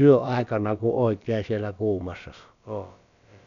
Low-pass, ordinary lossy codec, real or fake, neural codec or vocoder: 7.2 kHz; none; fake; codec, 16 kHz, about 1 kbps, DyCAST, with the encoder's durations